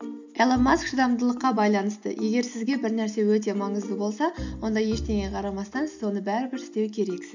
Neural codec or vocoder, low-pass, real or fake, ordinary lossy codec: none; 7.2 kHz; real; none